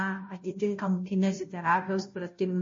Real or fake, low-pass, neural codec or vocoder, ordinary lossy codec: fake; 7.2 kHz; codec, 16 kHz, 0.5 kbps, FunCodec, trained on Chinese and English, 25 frames a second; MP3, 32 kbps